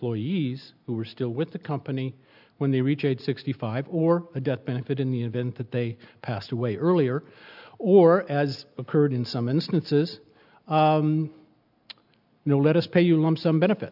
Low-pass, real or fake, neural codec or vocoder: 5.4 kHz; real; none